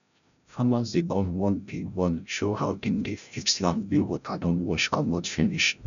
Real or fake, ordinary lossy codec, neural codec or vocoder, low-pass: fake; none; codec, 16 kHz, 0.5 kbps, FreqCodec, larger model; 7.2 kHz